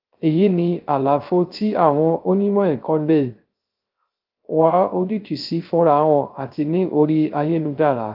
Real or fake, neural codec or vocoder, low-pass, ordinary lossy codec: fake; codec, 16 kHz, 0.3 kbps, FocalCodec; 5.4 kHz; Opus, 32 kbps